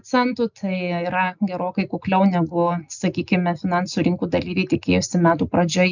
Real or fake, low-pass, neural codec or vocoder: real; 7.2 kHz; none